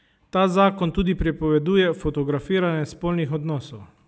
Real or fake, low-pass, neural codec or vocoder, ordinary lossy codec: real; none; none; none